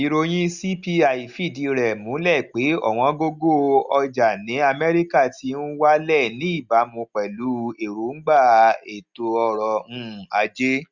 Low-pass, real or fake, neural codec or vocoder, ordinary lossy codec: 7.2 kHz; real; none; Opus, 64 kbps